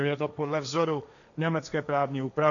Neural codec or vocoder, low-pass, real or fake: codec, 16 kHz, 1.1 kbps, Voila-Tokenizer; 7.2 kHz; fake